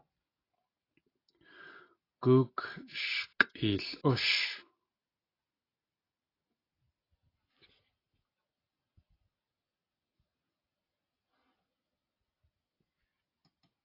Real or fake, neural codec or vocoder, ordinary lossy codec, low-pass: real; none; AAC, 24 kbps; 5.4 kHz